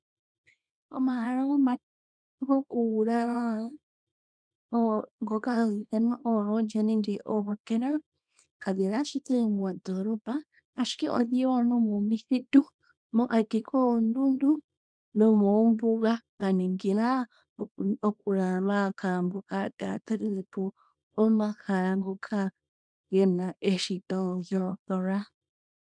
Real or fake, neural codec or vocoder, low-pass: fake; codec, 24 kHz, 0.9 kbps, WavTokenizer, small release; 9.9 kHz